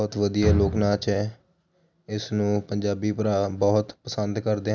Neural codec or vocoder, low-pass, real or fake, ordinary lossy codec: none; 7.2 kHz; real; none